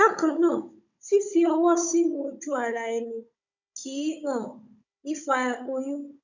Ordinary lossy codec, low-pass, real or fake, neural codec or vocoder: none; 7.2 kHz; fake; codec, 16 kHz, 16 kbps, FunCodec, trained on Chinese and English, 50 frames a second